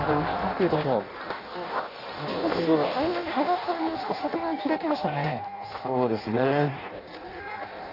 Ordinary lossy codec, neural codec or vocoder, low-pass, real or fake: AAC, 32 kbps; codec, 16 kHz in and 24 kHz out, 0.6 kbps, FireRedTTS-2 codec; 5.4 kHz; fake